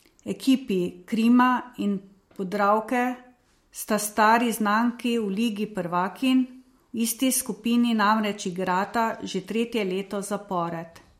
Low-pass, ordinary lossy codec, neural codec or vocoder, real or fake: 19.8 kHz; MP3, 64 kbps; none; real